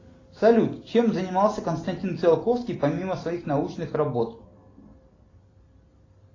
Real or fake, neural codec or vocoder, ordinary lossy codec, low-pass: real; none; AAC, 32 kbps; 7.2 kHz